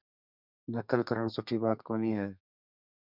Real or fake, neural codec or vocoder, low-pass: fake; codec, 32 kHz, 1.9 kbps, SNAC; 5.4 kHz